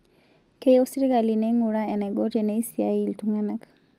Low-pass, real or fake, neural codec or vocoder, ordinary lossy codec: 14.4 kHz; real; none; Opus, 32 kbps